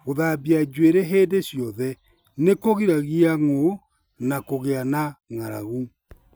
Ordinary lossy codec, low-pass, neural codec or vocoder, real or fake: none; none; none; real